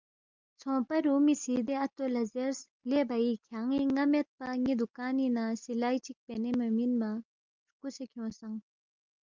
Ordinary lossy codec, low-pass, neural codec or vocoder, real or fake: Opus, 24 kbps; 7.2 kHz; none; real